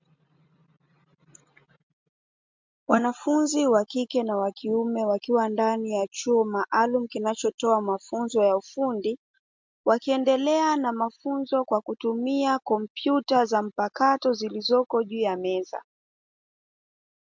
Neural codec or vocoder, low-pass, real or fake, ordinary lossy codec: none; 7.2 kHz; real; MP3, 64 kbps